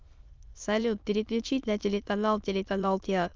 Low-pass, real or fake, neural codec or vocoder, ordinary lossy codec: 7.2 kHz; fake; autoencoder, 22.05 kHz, a latent of 192 numbers a frame, VITS, trained on many speakers; Opus, 24 kbps